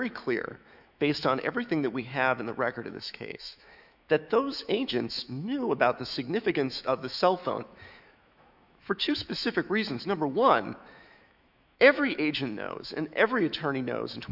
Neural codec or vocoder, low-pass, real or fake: autoencoder, 48 kHz, 128 numbers a frame, DAC-VAE, trained on Japanese speech; 5.4 kHz; fake